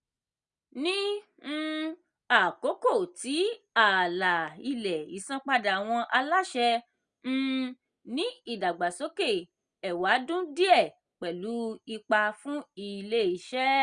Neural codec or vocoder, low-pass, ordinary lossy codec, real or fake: none; 9.9 kHz; Opus, 64 kbps; real